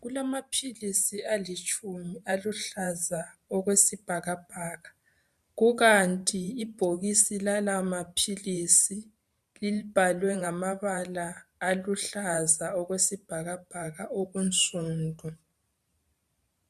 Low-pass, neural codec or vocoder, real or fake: 14.4 kHz; vocoder, 44.1 kHz, 128 mel bands every 512 samples, BigVGAN v2; fake